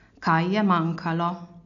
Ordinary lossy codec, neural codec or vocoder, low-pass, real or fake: MP3, 96 kbps; none; 7.2 kHz; real